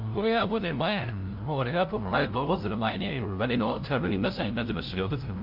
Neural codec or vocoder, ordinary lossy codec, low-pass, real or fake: codec, 16 kHz, 0.5 kbps, FunCodec, trained on LibriTTS, 25 frames a second; Opus, 24 kbps; 5.4 kHz; fake